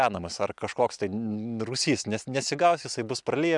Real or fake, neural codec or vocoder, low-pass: real; none; 10.8 kHz